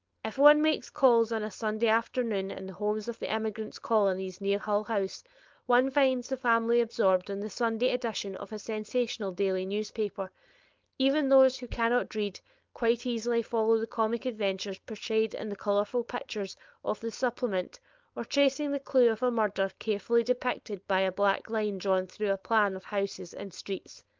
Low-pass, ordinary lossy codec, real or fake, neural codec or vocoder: 7.2 kHz; Opus, 24 kbps; fake; codec, 16 kHz, 4.8 kbps, FACodec